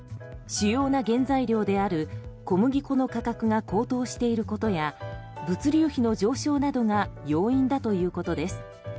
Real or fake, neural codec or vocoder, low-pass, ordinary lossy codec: real; none; none; none